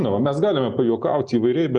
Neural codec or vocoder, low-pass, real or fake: none; 9.9 kHz; real